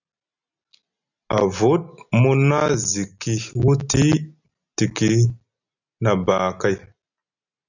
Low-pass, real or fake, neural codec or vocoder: 7.2 kHz; real; none